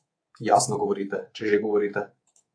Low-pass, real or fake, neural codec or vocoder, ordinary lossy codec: 9.9 kHz; fake; codec, 24 kHz, 3.1 kbps, DualCodec; AAC, 48 kbps